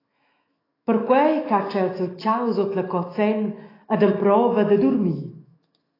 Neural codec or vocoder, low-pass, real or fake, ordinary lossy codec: autoencoder, 48 kHz, 128 numbers a frame, DAC-VAE, trained on Japanese speech; 5.4 kHz; fake; AAC, 24 kbps